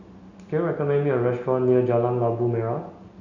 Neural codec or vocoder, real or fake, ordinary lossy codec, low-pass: none; real; none; 7.2 kHz